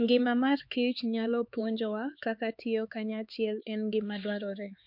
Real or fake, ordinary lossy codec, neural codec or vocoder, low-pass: fake; MP3, 48 kbps; codec, 16 kHz, 4 kbps, X-Codec, HuBERT features, trained on LibriSpeech; 5.4 kHz